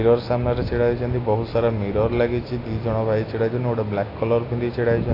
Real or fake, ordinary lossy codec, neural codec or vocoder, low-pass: real; AAC, 24 kbps; none; 5.4 kHz